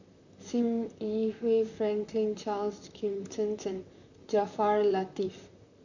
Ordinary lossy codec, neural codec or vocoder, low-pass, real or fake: none; vocoder, 44.1 kHz, 128 mel bands, Pupu-Vocoder; 7.2 kHz; fake